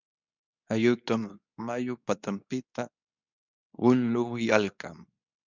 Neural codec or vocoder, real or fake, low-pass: codec, 24 kHz, 0.9 kbps, WavTokenizer, medium speech release version 2; fake; 7.2 kHz